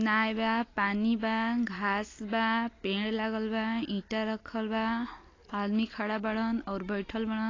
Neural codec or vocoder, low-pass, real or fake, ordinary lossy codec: none; 7.2 kHz; real; AAC, 32 kbps